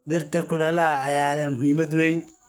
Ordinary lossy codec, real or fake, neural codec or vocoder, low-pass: none; fake; codec, 44.1 kHz, 2.6 kbps, SNAC; none